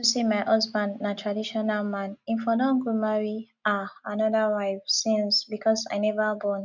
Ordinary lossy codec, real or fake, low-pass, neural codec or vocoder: none; real; 7.2 kHz; none